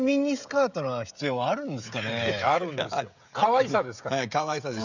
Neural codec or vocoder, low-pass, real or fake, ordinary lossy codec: codec, 16 kHz, 16 kbps, FreqCodec, smaller model; 7.2 kHz; fake; none